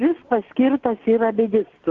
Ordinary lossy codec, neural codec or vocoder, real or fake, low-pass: Opus, 16 kbps; none; real; 7.2 kHz